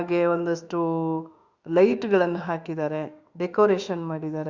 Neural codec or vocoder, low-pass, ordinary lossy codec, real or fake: autoencoder, 48 kHz, 32 numbers a frame, DAC-VAE, trained on Japanese speech; 7.2 kHz; Opus, 64 kbps; fake